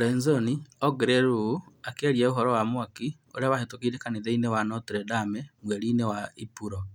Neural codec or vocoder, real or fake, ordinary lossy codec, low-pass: none; real; none; 19.8 kHz